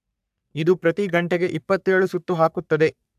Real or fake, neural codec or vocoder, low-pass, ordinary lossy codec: fake; codec, 44.1 kHz, 3.4 kbps, Pupu-Codec; 14.4 kHz; AAC, 96 kbps